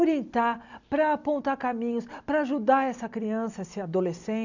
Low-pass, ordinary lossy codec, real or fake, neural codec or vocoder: 7.2 kHz; none; real; none